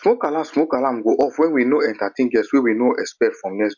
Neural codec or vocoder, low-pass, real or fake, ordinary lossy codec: none; 7.2 kHz; real; none